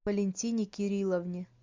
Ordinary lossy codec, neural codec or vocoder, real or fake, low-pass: MP3, 64 kbps; none; real; 7.2 kHz